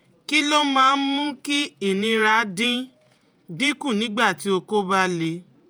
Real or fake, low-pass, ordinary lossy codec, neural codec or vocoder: fake; none; none; vocoder, 48 kHz, 128 mel bands, Vocos